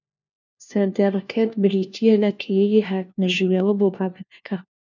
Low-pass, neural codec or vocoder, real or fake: 7.2 kHz; codec, 16 kHz, 1 kbps, FunCodec, trained on LibriTTS, 50 frames a second; fake